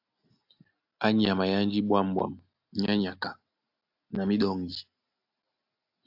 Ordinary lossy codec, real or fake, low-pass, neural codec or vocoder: AAC, 48 kbps; real; 5.4 kHz; none